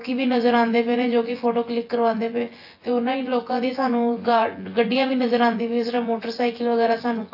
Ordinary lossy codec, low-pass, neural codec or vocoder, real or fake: AAC, 32 kbps; 5.4 kHz; vocoder, 24 kHz, 100 mel bands, Vocos; fake